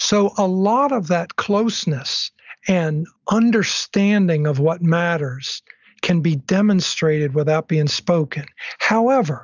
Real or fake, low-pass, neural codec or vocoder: real; 7.2 kHz; none